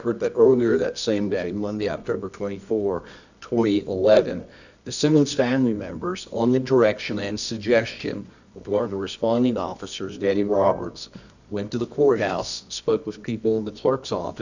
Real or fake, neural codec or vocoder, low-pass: fake; codec, 24 kHz, 0.9 kbps, WavTokenizer, medium music audio release; 7.2 kHz